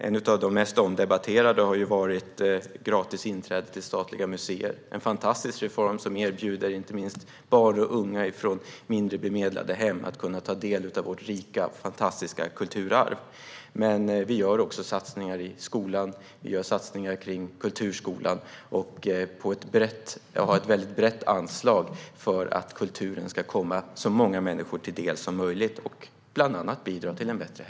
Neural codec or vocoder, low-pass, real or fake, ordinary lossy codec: none; none; real; none